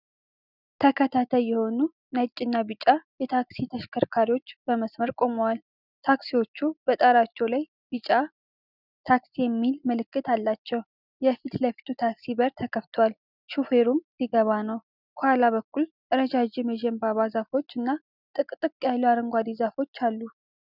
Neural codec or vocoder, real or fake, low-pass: none; real; 5.4 kHz